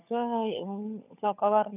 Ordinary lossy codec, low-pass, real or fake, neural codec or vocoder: none; 3.6 kHz; fake; codec, 16 kHz, 16 kbps, FunCodec, trained on LibriTTS, 50 frames a second